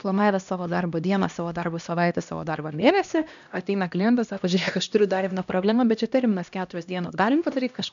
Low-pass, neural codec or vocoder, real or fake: 7.2 kHz; codec, 16 kHz, 1 kbps, X-Codec, HuBERT features, trained on LibriSpeech; fake